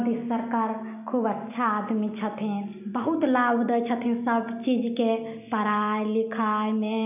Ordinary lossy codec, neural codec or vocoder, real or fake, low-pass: none; none; real; 3.6 kHz